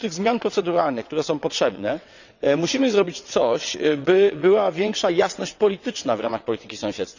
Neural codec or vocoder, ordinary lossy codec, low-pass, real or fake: vocoder, 22.05 kHz, 80 mel bands, WaveNeXt; none; 7.2 kHz; fake